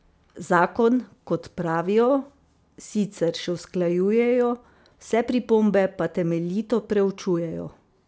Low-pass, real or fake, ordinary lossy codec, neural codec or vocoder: none; real; none; none